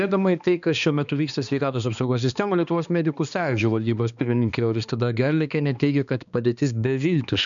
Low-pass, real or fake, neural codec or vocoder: 7.2 kHz; fake; codec, 16 kHz, 2 kbps, X-Codec, HuBERT features, trained on balanced general audio